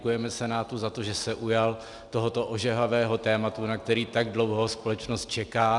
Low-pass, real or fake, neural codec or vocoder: 10.8 kHz; real; none